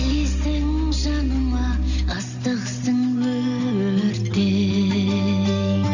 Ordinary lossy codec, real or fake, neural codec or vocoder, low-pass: none; real; none; 7.2 kHz